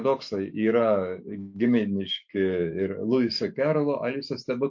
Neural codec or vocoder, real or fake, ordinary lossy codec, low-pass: autoencoder, 48 kHz, 128 numbers a frame, DAC-VAE, trained on Japanese speech; fake; MP3, 48 kbps; 7.2 kHz